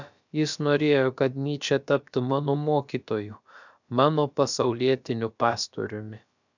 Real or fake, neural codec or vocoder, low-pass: fake; codec, 16 kHz, about 1 kbps, DyCAST, with the encoder's durations; 7.2 kHz